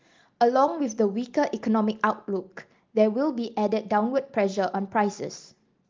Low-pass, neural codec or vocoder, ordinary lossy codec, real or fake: 7.2 kHz; none; Opus, 32 kbps; real